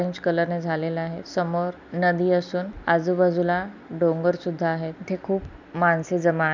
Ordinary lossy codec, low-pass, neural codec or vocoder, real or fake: none; 7.2 kHz; none; real